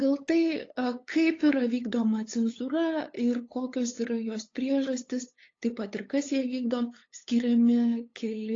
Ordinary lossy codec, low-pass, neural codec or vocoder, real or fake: AAC, 32 kbps; 7.2 kHz; codec, 16 kHz, 8 kbps, FunCodec, trained on LibriTTS, 25 frames a second; fake